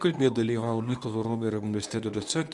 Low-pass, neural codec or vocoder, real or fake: 10.8 kHz; codec, 24 kHz, 0.9 kbps, WavTokenizer, medium speech release version 1; fake